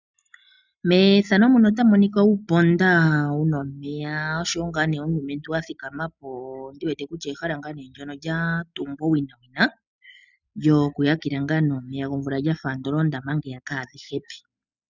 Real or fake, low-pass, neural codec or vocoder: real; 7.2 kHz; none